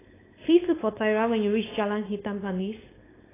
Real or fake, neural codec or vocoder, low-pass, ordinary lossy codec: fake; codec, 16 kHz, 4.8 kbps, FACodec; 3.6 kHz; AAC, 16 kbps